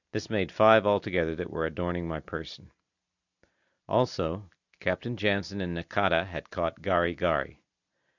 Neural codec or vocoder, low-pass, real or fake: none; 7.2 kHz; real